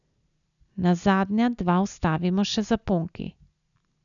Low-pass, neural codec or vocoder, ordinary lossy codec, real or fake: 7.2 kHz; none; none; real